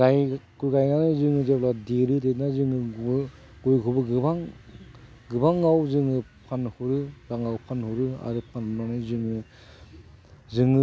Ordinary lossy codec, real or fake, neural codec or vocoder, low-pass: none; real; none; none